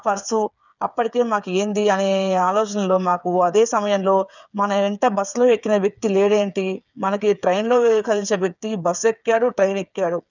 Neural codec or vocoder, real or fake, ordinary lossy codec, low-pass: codec, 16 kHz, 8 kbps, FreqCodec, smaller model; fake; none; 7.2 kHz